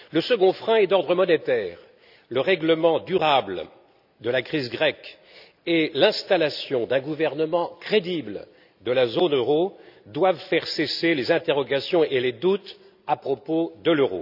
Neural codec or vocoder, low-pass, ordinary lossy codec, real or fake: none; 5.4 kHz; none; real